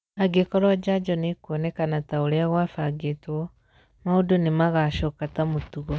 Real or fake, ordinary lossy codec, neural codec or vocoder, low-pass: real; none; none; none